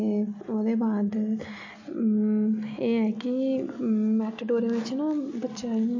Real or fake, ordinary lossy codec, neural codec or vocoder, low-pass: real; MP3, 48 kbps; none; 7.2 kHz